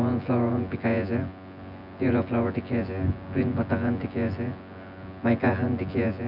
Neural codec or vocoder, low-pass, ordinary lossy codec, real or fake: vocoder, 24 kHz, 100 mel bands, Vocos; 5.4 kHz; none; fake